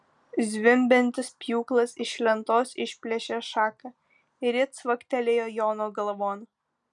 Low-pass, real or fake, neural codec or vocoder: 10.8 kHz; real; none